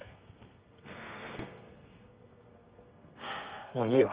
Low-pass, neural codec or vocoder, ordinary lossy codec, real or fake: 3.6 kHz; codec, 32 kHz, 1.9 kbps, SNAC; Opus, 24 kbps; fake